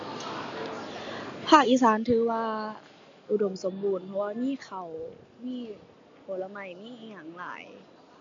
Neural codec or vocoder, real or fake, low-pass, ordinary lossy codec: none; real; 7.2 kHz; AAC, 64 kbps